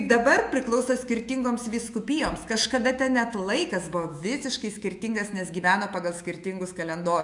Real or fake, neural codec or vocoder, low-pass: fake; autoencoder, 48 kHz, 128 numbers a frame, DAC-VAE, trained on Japanese speech; 10.8 kHz